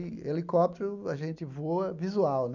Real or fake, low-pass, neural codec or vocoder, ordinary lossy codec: real; 7.2 kHz; none; none